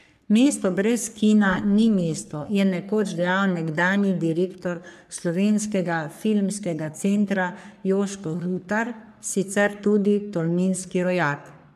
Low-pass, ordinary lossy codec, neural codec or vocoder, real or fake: 14.4 kHz; none; codec, 44.1 kHz, 3.4 kbps, Pupu-Codec; fake